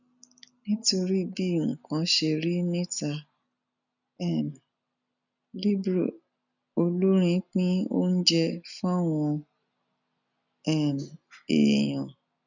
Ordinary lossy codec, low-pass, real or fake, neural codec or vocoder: none; 7.2 kHz; real; none